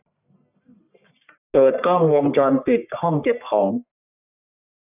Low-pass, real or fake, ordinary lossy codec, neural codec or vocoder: 3.6 kHz; fake; none; codec, 44.1 kHz, 1.7 kbps, Pupu-Codec